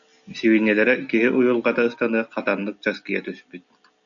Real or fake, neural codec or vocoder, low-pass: real; none; 7.2 kHz